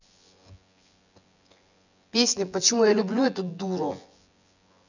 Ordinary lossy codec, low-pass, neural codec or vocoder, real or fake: none; 7.2 kHz; vocoder, 24 kHz, 100 mel bands, Vocos; fake